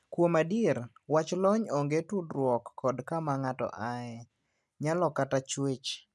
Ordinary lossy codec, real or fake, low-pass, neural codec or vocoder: none; real; none; none